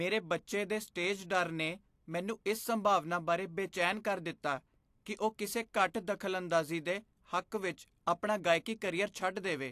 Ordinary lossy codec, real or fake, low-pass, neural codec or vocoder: AAC, 64 kbps; real; 14.4 kHz; none